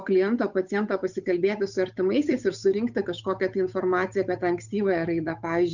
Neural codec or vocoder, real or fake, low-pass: codec, 16 kHz, 8 kbps, FunCodec, trained on Chinese and English, 25 frames a second; fake; 7.2 kHz